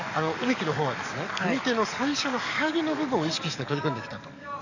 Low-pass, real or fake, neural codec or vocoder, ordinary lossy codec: 7.2 kHz; fake; codec, 44.1 kHz, 7.8 kbps, DAC; none